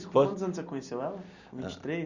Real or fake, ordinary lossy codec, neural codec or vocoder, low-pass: real; Opus, 64 kbps; none; 7.2 kHz